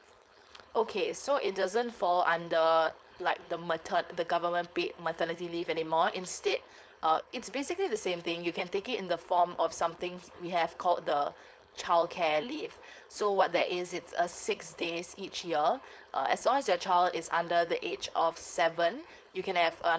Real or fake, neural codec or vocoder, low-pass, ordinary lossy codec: fake; codec, 16 kHz, 4.8 kbps, FACodec; none; none